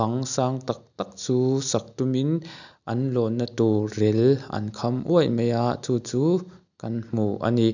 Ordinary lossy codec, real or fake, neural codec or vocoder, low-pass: none; real; none; 7.2 kHz